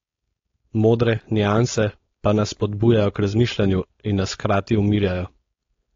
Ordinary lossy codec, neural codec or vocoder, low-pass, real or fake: AAC, 32 kbps; codec, 16 kHz, 4.8 kbps, FACodec; 7.2 kHz; fake